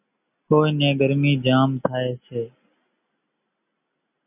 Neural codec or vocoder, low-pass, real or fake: none; 3.6 kHz; real